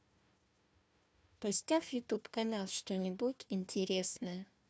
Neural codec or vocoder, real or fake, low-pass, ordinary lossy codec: codec, 16 kHz, 1 kbps, FunCodec, trained on Chinese and English, 50 frames a second; fake; none; none